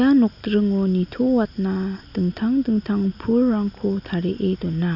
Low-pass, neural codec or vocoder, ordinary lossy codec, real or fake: 5.4 kHz; none; none; real